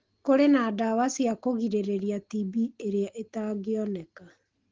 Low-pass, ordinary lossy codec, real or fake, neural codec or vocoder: 7.2 kHz; Opus, 16 kbps; real; none